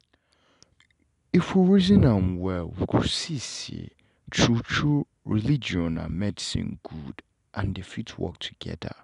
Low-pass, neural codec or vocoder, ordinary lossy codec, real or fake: 10.8 kHz; none; none; real